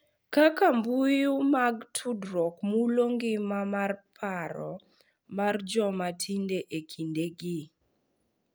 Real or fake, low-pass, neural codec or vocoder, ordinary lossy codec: real; none; none; none